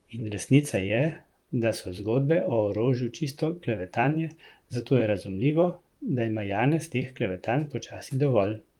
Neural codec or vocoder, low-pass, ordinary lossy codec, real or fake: vocoder, 44.1 kHz, 128 mel bands, Pupu-Vocoder; 19.8 kHz; Opus, 32 kbps; fake